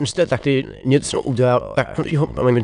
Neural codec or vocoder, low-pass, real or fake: autoencoder, 22.05 kHz, a latent of 192 numbers a frame, VITS, trained on many speakers; 9.9 kHz; fake